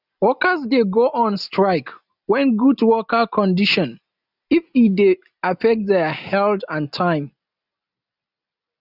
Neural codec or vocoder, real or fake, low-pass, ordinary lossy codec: none; real; 5.4 kHz; none